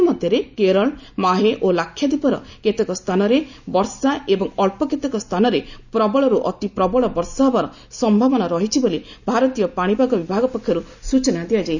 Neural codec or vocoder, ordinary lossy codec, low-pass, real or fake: none; none; 7.2 kHz; real